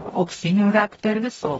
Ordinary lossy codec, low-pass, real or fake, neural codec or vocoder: AAC, 24 kbps; 19.8 kHz; fake; codec, 44.1 kHz, 0.9 kbps, DAC